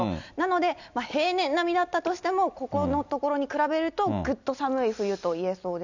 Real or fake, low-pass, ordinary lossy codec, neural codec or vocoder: real; 7.2 kHz; none; none